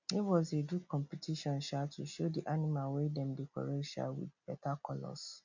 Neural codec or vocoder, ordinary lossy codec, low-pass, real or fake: none; none; 7.2 kHz; real